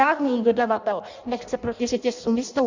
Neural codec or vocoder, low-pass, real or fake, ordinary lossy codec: codec, 16 kHz in and 24 kHz out, 0.6 kbps, FireRedTTS-2 codec; 7.2 kHz; fake; Opus, 64 kbps